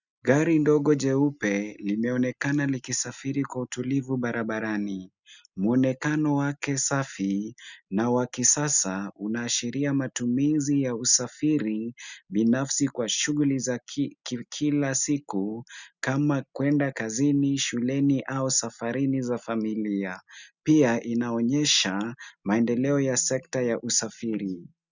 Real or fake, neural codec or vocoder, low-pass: real; none; 7.2 kHz